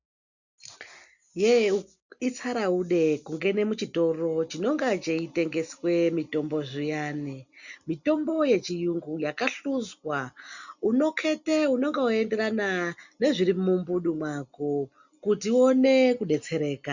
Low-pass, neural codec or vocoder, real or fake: 7.2 kHz; none; real